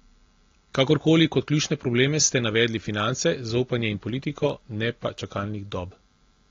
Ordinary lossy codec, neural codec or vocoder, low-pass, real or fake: AAC, 32 kbps; none; 7.2 kHz; real